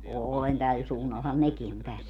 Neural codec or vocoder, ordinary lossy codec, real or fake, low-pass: none; none; real; 19.8 kHz